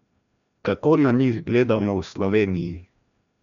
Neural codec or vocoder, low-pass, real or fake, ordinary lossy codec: codec, 16 kHz, 1 kbps, FreqCodec, larger model; 7.2 kHz; fake; none